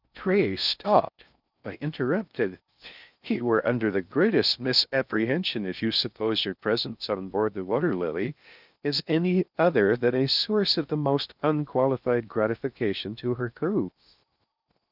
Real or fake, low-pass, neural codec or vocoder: fake; 5.4 kHz; codec, 16 kHz in and 24 kHz out, 0.6 kbps, FocalCodec, streaming, 4096 codes